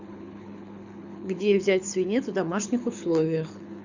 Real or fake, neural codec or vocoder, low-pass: fake; codec, 24 kHz, 6 kbps, HILCodec; 7.2 kHz